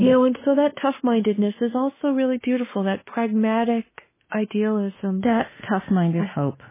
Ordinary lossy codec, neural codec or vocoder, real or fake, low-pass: MP3, 16 kbps; autoencoder, 48 kHz, 32 numbers a frame, DAC-VAE, trained on Japanese speech; fake; 3.6 kHz